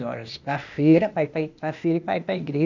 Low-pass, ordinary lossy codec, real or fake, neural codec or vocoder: 7.2 kHz; none; fake; codec, 16 kHz, 0.8 kbps, ZipCodec